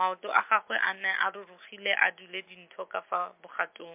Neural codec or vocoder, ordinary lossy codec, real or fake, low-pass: none; MP3, 32 kbps; real; 3.6 kHz